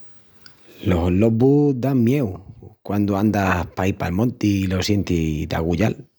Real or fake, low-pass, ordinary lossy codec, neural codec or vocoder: real; none; none; none